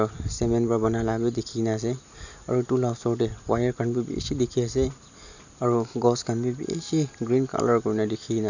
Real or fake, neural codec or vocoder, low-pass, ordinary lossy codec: real; none; 7.2 kHz; none